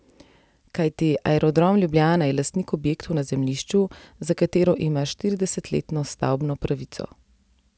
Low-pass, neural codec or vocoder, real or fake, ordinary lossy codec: none; none; real; none